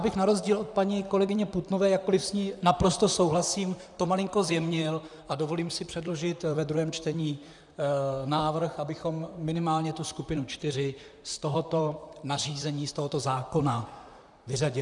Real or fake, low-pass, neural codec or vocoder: fake; 10.8 kHz; vocoder, 44.1 kHz, 128 mel bands, Pupu-Vocoder